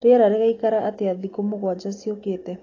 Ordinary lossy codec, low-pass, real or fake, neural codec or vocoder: AAC, 32 kbps; 7.2 kHz; real; none